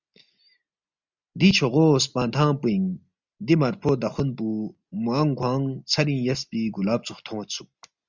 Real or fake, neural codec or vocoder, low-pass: real; none; 7.2 kHz